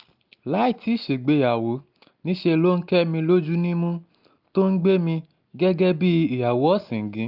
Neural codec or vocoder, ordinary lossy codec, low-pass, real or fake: none; Opus, 24 kbps; 5.4 kHz; real